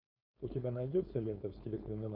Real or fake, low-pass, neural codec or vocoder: fake; 5.4 kHz; codec, 16 kHz, 4.8 kbps, FACodec